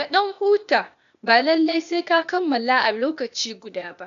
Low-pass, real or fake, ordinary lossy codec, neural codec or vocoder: 7.2 kHz; fake; none; codec, 16 kHz, 0.8 kbps, ZipCodec